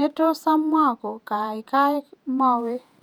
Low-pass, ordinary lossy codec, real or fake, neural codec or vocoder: 19.8 kHz; none; fake; vocoder, 48 kHz, 128 mel bands, Vocos